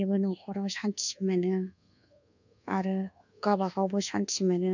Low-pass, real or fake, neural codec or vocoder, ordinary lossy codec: 7.2 kHz; fake; codec, 24 kHz, 1.2 kbps, DualCodec; none